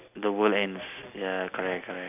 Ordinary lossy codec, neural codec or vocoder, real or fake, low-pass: none; none; real; 3.6 kHz